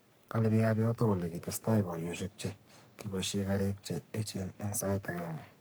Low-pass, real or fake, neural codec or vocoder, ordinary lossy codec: none; fake; codec, 44.1 kHz, 3.4 kbps, Pupu-Codec; none